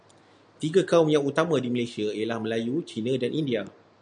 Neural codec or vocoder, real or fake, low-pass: none; real; 9.9 kHz